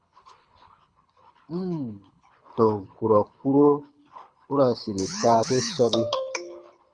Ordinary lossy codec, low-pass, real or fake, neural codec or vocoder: Opus, 64 kbps; 9.9 kHz; fake; codec, 24 kHz, 6 kbps, HILCodec